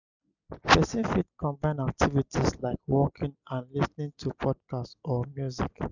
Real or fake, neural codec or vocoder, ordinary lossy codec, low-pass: real; none; none; 7.2 kHz